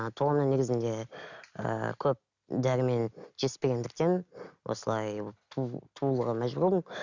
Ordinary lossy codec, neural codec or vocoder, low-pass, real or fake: none; none; 7.2 kHz; real